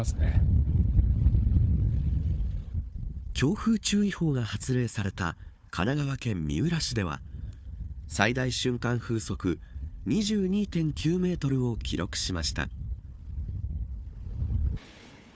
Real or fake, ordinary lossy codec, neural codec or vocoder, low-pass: fake; none; codec, 16 kHz, 4 kbps, FunCodec, trained on Chinese and English, 50 frames a second; none